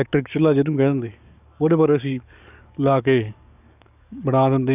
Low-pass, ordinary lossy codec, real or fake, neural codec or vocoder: 3.6 kHz; none; fake; codec, 44.1 kHz, 7.8 kbps, DAC